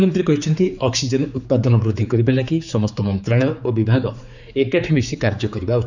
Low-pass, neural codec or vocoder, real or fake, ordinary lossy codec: 7.2 kHz; codec, 16 kHz, 4 kbps, X-Codec, HuBERT features, trained on general audio; fake; none